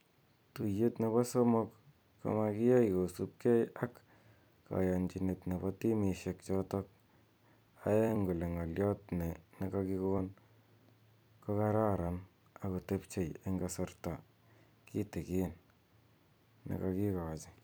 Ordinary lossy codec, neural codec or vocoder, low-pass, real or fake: none; vocoder, 44.1 kHz, 128 mel bands every 256 samples, BigVGAN v2; none; fake